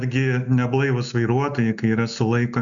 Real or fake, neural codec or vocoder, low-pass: real; none; 7.2 kHz